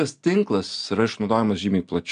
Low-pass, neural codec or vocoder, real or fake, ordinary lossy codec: 9.9 kHz; none; real; AAC, 96 kbps